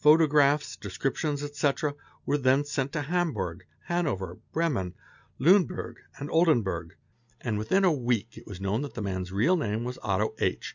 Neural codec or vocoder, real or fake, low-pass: none; real; 7.2 kHz